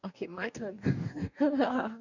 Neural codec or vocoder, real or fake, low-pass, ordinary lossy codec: codec, 44.1 kHz, 2.6 kbps, DAC; fake; 7.2 kHz; none